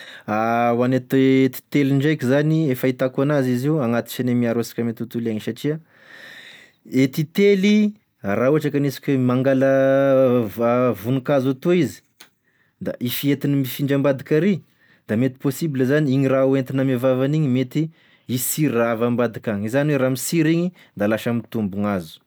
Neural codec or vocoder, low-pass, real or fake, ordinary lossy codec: none; none; real; none